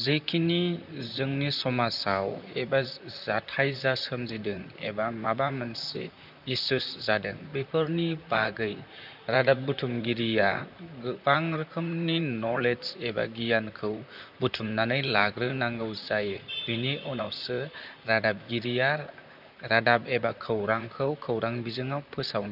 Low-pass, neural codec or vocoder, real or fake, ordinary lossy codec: 5.4 kHz; vocoder, 44.1 kHz, 128 mel bands, Pupu-Vocoder; fake; none